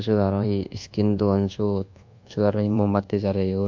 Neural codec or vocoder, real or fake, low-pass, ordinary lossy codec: codec, 24 kHz, 1.2 kbps, DualCodec; fake; 7.2 kHz; MP3, 64 kbps